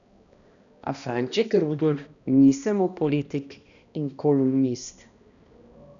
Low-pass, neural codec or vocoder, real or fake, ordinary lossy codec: 7.2 kHz; codec, 16 kHz, 1 kbps, X-Codec, HuBERT features, trained on balanced general audio; fake; none